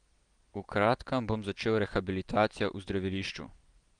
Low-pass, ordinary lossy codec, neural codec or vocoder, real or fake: 9.9 kHz; Opus, 24 kbps; none; real